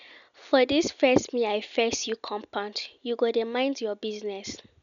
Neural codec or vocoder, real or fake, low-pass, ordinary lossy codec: none; real; 7.2 kHz; none